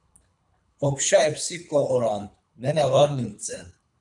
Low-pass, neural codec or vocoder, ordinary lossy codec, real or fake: 10.8 kHz; codec, 24 kHz, 3 kbps, HILCodec; MP3, 96 kbps; fake